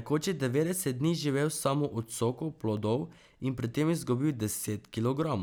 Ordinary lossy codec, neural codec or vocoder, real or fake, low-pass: none; none; real; none